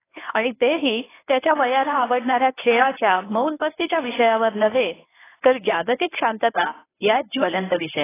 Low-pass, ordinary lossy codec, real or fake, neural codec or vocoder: 3.6 kHz; AAC, 16 kbps; fake; codec, 24 kHz, 0.9 kbps, WavTokenizer, medium speech release version 1